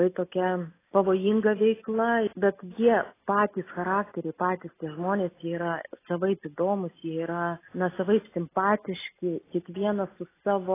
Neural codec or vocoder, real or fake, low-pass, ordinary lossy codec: none; real; 3.6 kHz; AAC, 16 kbps